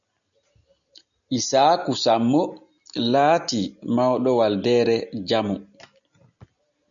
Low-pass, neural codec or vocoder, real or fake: 7.2 kHz; none; real